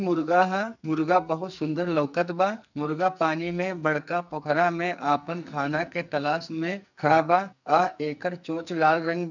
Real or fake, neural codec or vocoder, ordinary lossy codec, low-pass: fake; codec, 44.1 kHz, 2.6 kbps, SNAC; none; 7.2 kHz